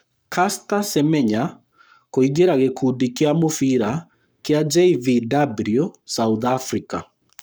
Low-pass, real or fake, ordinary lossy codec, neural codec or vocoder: none; fake; none; codec, 44.1 kHz, 7.8 kbps, Pupu-Codec